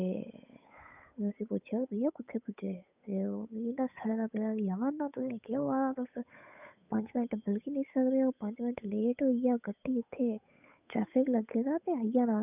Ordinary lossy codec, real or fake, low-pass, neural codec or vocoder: none; fake; 3.6 kHz; codec, 16 kHz, 8 kbps, FunCodec, trained on Chinese and English, 25 frames a second